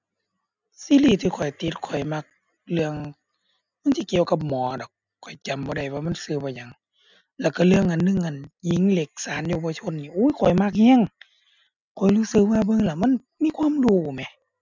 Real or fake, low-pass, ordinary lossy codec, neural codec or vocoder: real; 7.2 kHz; none; none